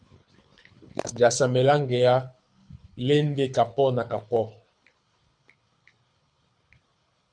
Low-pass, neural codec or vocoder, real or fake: 9.9 kHz; codec, 24 kHz, 6 kbps, HILCodec; fake